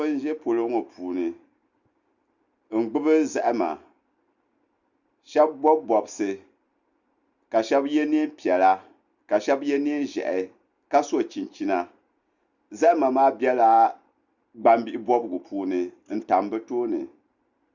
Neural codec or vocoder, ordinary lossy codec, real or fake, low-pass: none; Opus, 64 kbps; real; 7.2 kHz